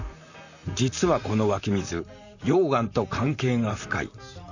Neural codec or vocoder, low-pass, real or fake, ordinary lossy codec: vocoder, 44.1 kHz, 128 mel bands, Pupu-Vocoder; 7.2 kHz; fake; none